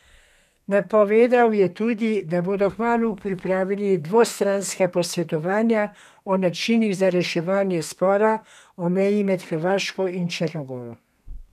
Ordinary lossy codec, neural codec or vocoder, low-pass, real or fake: none; codec, 32 kHz, 1.9 kbps, SNAC; 14.4 kHz; fake